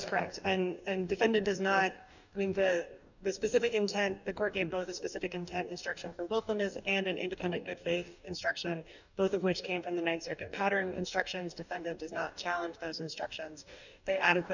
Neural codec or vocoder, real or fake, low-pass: codec, 44.1 kHz, 2.6 kbps, DAC; fake; 7.2 kHz